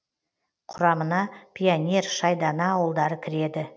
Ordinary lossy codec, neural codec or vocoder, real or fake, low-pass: none; none; real; none